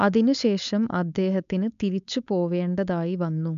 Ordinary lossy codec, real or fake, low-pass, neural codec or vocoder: MP3, 96 kbps; fake; 7.2 kHz; codec, 16 kHz, 4.8 kbps, FACodec